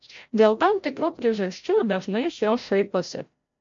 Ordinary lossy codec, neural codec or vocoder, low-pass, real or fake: MP3, 48 kbps; codec, 16 kHz, 0.5 kbps, FreqCodec, larger model; 7.2 kHz; fake